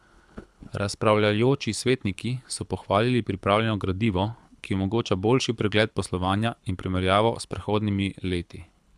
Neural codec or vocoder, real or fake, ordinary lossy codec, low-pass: codec, 24 kHz, 6 kbps, HILCodec; fake; none; none